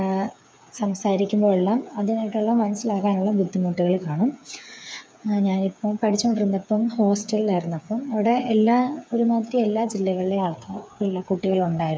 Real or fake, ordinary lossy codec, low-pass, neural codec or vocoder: fake; none; none; codec, 16 kHz, 8 kbps, FreqCodec, smaller model